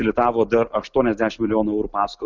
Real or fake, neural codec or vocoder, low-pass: real; none; 7.2 kHz